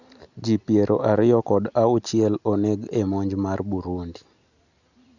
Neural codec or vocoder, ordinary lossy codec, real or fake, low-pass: none; none; real; 7.2 kHz